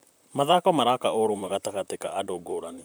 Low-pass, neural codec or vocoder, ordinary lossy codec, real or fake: none; vocoder, 44.1 kHz, 128 mel bands, Pupu-Vocoder; none; fake